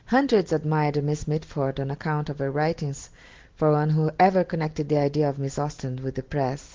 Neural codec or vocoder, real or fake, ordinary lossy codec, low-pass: none; real; Opus, 16 kbps; 7.2 kHz